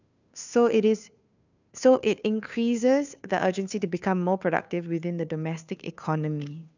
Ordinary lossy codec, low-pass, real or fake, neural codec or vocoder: none; 7.2 kHz; fake; codec, 16 kHz, 2 kbps, FunCodec, trained on Chinese and English, 25 frames a second